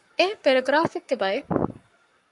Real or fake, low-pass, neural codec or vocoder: fake; 10.8 kHz; codec, 44.1 kHz, 7.8 kbps, Pupu-Codec